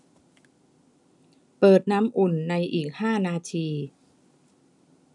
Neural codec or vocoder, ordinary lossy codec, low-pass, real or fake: none; none; 10.8 kHz; real